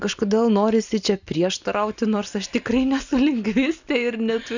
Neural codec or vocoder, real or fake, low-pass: none; real; 7.2 kHz